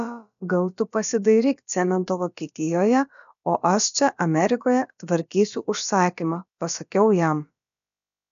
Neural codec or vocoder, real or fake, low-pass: codec, 16 kHz, about 1 kbps, DyCAST, with the encoder's durations; fake; 7.2 kHz